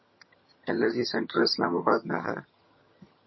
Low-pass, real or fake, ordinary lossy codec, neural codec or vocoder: 7.2 kHz; fake; MP3, 24 kbps; vocoder, 22.05 kHz, 80 mel bands, HiFi-GAN